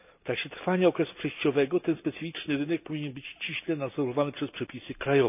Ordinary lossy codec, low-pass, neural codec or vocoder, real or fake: none; 3.6 kHz; none; real